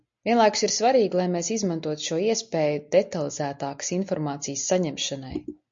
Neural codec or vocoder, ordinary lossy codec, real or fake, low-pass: none; MP3, 48 kbps; real; 7.2 kHz